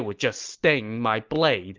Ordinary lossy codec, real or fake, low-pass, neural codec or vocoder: Opus, 32 kbps; real; 7.2 kHz; none